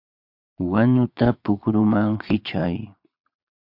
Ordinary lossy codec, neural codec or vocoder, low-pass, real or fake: MP3, 48 kbps; vocoder, 22.05 kHz, 80 mel bands, Vocos; 5.4 kHz; fake